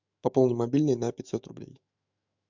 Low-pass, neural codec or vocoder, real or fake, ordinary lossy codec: 7.2 kHz; autoencoder, 48 kHz, 128 numbers a frame, DAC-VAE, trained on Japanese speech; fake; MP3, 64 kbps